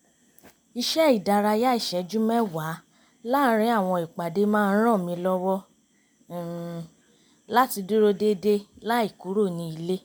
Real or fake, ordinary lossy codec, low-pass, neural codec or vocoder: real; none; none; none